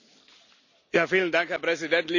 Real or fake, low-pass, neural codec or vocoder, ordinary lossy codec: real; 7.2 kHz; none; none